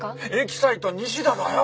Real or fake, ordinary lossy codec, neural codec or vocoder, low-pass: real; none; none; none